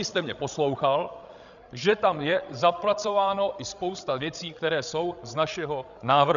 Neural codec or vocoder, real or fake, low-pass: codec, 16 kHz, 16 kbps, FreqCodec, larger model; fake; 7.2 kHz